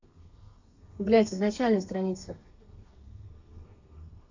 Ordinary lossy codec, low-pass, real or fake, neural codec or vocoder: AAC, 48 kbps; 7.2 kHz; fake; codec, 32 kHz, 1.9 kbps, SNAC